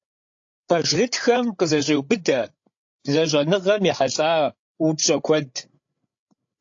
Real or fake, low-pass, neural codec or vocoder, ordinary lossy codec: fake; 7.2 kHz; codec, 16 kHz, 8 kbps, FreqCodec, larger model; AAC, 48 kbps